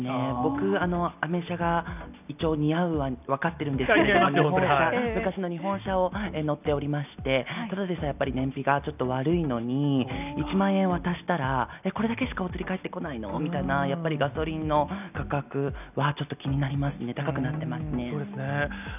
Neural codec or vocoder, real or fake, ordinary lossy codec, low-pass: none; real; none; 3.6 kHz